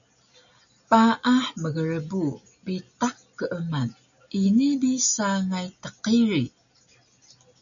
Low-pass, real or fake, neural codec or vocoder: 7.2 kHz; real; none